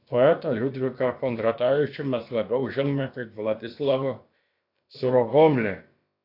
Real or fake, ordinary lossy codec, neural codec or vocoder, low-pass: fake; AAC, 32 kbps; codec, 16 kHz, about 1 kbps, DyCAST, with the encoder's durations; 5.4 kHz